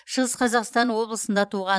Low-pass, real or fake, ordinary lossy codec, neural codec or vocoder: none; fake; none; vocoder, 22.05 kHz, 80 mel bands, Vocos